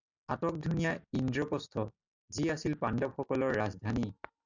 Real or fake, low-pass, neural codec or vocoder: real; 7.2 kHz; none